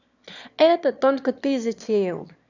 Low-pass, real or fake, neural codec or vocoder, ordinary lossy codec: 7.2 kHz; fake; autoencoder, 22.05 kHz, a latent of 192 numbers a frame, VITS, trained on one speaker; none